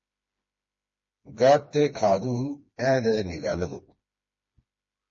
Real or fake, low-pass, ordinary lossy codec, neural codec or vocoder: fake; 7.2 kHz; MP3, 32 kbps; codec, 16 kHz, 2 kbps, FreqCodec, smaller model